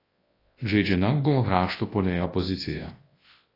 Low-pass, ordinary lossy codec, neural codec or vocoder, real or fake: 5.4 kHz; AAC, 24 kbps; codec, 24 kHz, 0.9 kbps, WavTokenizer, large speech release; fake